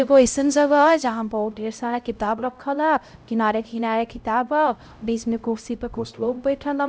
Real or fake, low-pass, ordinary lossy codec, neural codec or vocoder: fake; none; none; codec, 16 kHz, 0.5 kbps, X-Codec, HuBERT features, trained on LibriSpeech